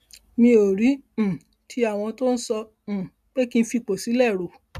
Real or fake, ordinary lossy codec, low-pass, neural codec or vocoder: real; none; 14.4 kHz; none